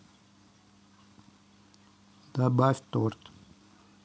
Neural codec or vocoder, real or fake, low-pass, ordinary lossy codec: none; real; none; none